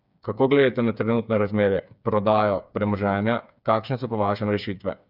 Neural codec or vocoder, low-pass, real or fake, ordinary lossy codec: codec, 16 kHz, 4 kbps, FreqCodec, smaller model; 5.4 kHz; fake; none